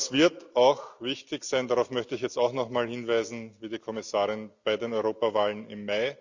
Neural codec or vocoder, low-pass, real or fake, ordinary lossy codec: none; 7.2 kHz; real; Opus, 64 kbps